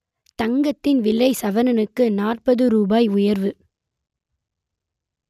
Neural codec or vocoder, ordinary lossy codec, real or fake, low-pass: none; none; real; 14.4 kHz